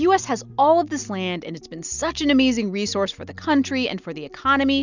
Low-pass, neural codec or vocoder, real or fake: 7.2 kHz; none; real